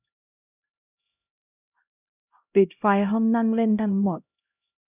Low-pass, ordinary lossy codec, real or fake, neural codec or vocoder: 3.6 kHz; none; fake; codec, 16 kHz, 0.5 kbps, X-Codec, HuBERT features, trained on LibriSpeech